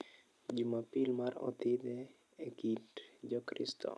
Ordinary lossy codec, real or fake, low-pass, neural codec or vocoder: none; real; none; none